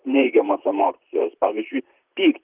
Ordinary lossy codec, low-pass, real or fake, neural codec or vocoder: Opus, 32 kbps; 3.6 kHz; fake; vocoder, 44.1 kHz, 128 mel bands, Pupu-Vocoder